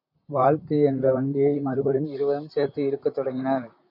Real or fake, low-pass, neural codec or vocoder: fake; 5.4 kHz; vocoder, 44.1 kHz, 128 mel bands, Pupu-Vocoder